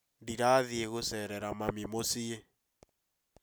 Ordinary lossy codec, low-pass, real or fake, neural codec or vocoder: none; none; real; none